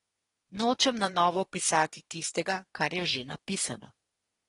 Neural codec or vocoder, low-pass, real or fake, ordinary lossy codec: codec, 24 kHz, 1 kbps, SNAC; 10.8 kHz; fake; AAC, 32 kbps